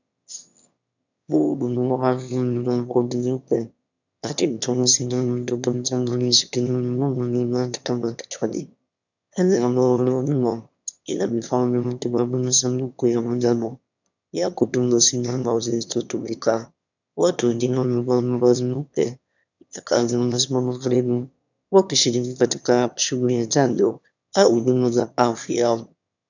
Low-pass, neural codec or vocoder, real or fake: 7.2 kHz; autoencoder, 22.05 kHz, a latent of 192 numbers a frame, VITS, trained on one speaker; fake